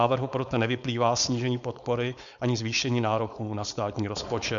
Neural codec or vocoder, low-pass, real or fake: codec, 16 kHz, 4.8 kbps, FACodec; 7.2 kHz; fake